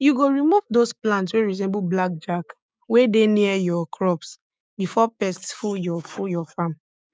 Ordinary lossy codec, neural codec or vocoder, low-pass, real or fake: none; codec, 16 kHz, 6 kbps, DAC; none; fake